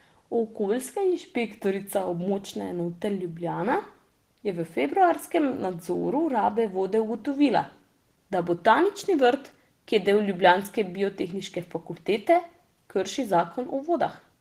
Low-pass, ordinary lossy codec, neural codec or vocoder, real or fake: 14.4 kHz; Opus, 16 kbps; none; real